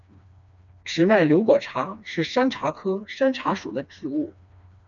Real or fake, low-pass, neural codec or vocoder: fake; 7.2 kHz; codec, 16 kHz, 2 kbps, FreqCodec, smaller model